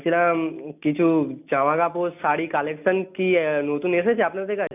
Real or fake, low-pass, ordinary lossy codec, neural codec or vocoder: real; 3.6 kHz; none; none